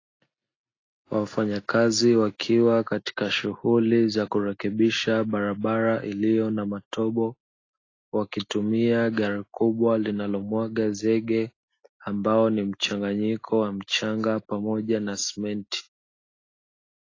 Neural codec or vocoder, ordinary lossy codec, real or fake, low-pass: none; AAC, 32 kbps; real; 7.2 kHz